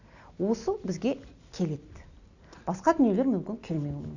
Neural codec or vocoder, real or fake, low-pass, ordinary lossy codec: none; real; 7.2 kHz; none